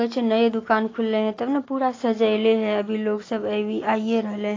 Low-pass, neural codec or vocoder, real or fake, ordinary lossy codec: 7.2 kHz; none; real; AAC, 32 kbps